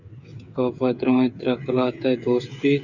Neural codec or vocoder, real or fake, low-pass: codec, 16 kHz, 8 kbps, FreqCodec, smaller model; fake; 7.2 kHz